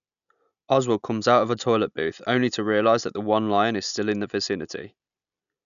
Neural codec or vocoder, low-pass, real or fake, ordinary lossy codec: none; 7.2 kHz; real; none